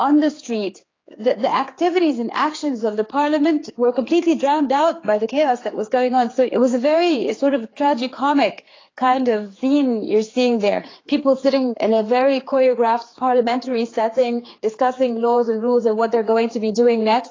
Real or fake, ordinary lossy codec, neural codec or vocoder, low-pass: fake; AAC, 32 kbps; codec, 16 kHz, 4 kbps, X-Codec, HuBERT features, trained on general audio; 7.2 kHz